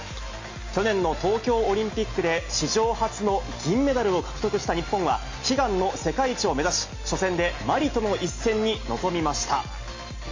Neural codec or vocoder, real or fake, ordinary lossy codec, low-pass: none; real; MP3, 32 kbps; 7.2 kHz